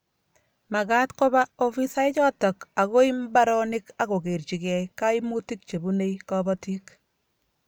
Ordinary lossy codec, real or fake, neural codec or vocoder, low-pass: none; real; none; none